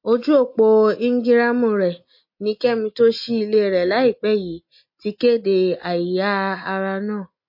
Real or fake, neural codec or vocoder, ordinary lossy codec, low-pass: real; none; MP3, 32 kbps; 5.4 kHz